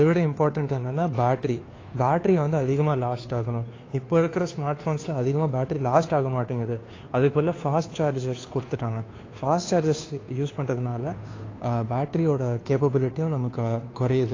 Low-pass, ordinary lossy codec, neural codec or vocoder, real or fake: 7.2 kHz; AAC, 32 kbps; codec, 16 kHz, 2 kbps, FunCodec, trained on Chinese and English, 25 frames a second; fake